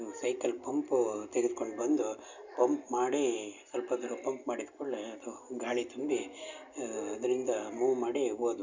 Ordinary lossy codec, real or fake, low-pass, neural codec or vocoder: none; real; 7.2 kHz; none